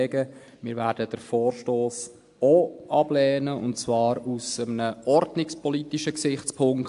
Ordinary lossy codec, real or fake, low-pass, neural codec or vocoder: AAC, 64 kbps; real; 10.8 kHz; none